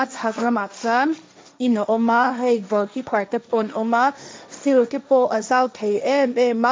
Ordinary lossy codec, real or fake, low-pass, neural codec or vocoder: none; fake; none; codec, 16 kHz, 1.1 kbps, Voila-Tokenizer